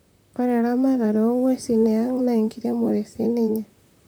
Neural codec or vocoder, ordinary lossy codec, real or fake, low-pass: vocoder, 44.1 kHz, 128 mel bands, Pupu-Vocoder; none; fake; none